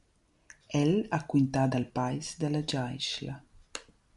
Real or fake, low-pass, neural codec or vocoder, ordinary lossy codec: real; 10.8 kHz; none; MP3, 96 kbps